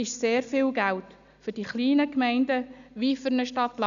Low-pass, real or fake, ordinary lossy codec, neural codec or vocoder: 7.2 kHz; real; none; none